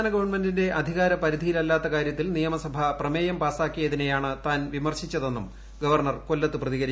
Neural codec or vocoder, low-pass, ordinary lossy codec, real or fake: none; none; none; real